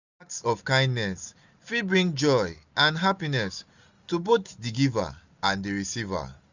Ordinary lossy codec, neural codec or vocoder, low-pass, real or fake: none; none; 7.2 kHz; real